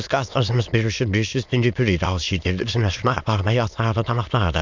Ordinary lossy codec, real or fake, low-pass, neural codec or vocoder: MP3, 64 kbps; fake; 7.2 kHz; autoencoder, 22.05 kHz, a latent of 192 numbers a frame, VITS, trained on many speakers